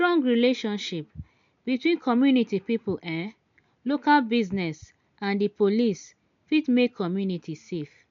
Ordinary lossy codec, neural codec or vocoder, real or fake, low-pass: MP3, 64 kbps; none; real; 7.2 kHz